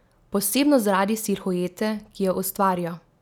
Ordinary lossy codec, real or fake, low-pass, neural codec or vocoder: none; real; none; none